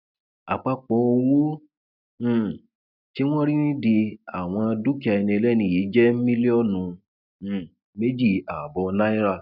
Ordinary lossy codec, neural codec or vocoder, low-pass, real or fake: none; none; 5.4 kHz; real